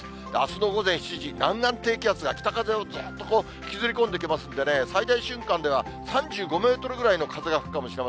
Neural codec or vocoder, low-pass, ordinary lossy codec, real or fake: none; none; none; real